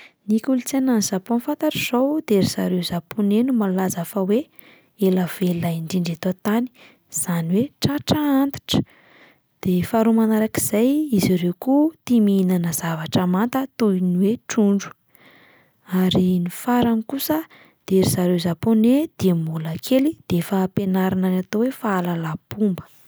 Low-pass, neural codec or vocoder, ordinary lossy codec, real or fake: none; none; none; real